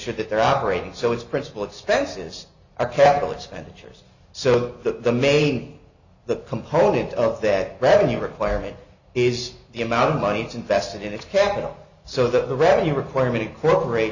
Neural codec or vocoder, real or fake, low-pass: none; real; 7.2 kHz